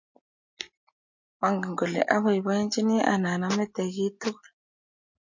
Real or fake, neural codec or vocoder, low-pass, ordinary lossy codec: real; none; 7.2 kHz; MP3, 48 kbps